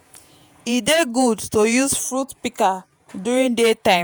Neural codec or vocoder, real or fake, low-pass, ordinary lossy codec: vocoder, 48 kHz, 128 mel bands, Vocos; fake; none; none